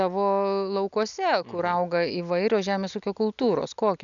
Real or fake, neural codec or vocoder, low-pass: real; none; 7.2 kHz